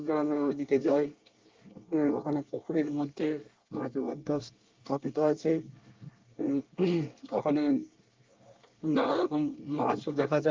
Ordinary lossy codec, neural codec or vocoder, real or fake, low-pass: Opus, 24 kbps; codec, 24 kHz, 1 kbps, SNAC; fake; 7.2 kHz